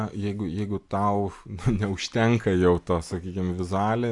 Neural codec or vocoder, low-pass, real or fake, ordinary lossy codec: none; 10.8 kHz; real; Opus, 64 kbps